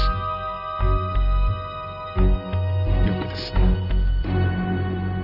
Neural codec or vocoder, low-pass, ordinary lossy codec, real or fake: none; 5.4 kHz; none; real